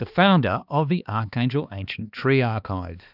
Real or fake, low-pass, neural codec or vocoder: fake; 5.4 kHz; codec, 16 kHz, 2 kbps, X-Codec, HuBERT features, trained on balanced general audio